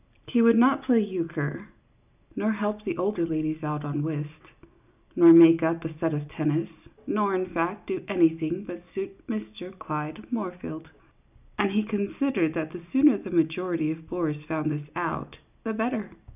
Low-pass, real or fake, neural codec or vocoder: 3.6 kHz; real; none